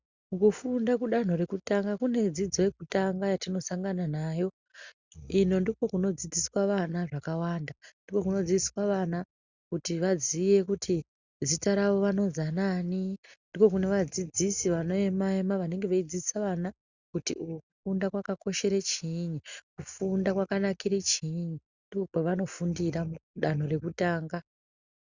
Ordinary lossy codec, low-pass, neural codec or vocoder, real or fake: Opus, 64 kbps; 7.2 kHz; none; real